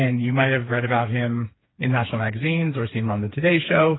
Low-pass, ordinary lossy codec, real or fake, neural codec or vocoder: 7.2 kHz; AAC, 16 kbps; fake; codec, 16 kHz, 4 kbps, FreqCodec, smaller model